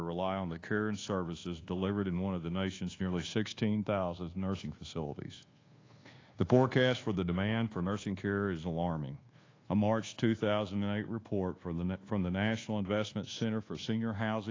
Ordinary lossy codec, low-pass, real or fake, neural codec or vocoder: AAC, 32 kbps; 7.2 kHz; fake; codec, 24 kHz, 1.2 kbps, DualCodec